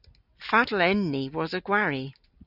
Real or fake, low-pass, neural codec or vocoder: real; 5.4 kHz; none